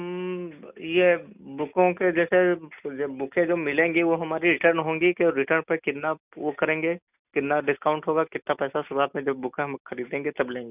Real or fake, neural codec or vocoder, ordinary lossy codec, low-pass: real; none; none; 3.6 kHz